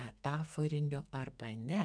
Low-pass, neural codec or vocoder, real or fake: 9.9 kHz; codec, 44.1 kHz, 2.6 kbps, SNAC; fake